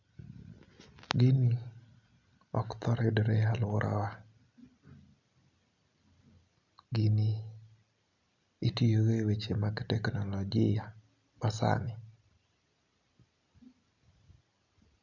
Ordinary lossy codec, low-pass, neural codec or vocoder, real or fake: none; 7.2 kHz; none; real